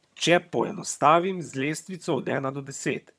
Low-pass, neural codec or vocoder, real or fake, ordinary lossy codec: none; vocoder, 22.05 kHz, 80 mel bands, HiFi-GAN; fake; none